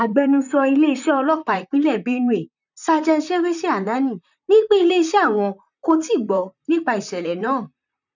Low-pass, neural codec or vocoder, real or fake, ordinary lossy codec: 7.2 kHz; vocoder, 44.1 kHz, 128 mel bands, Pupu-Vocoder; fake; none